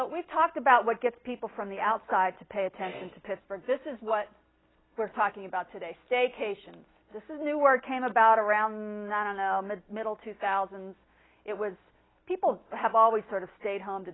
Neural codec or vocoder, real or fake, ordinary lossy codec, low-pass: none; real; AAC, 16 kbps; 7.2 kHz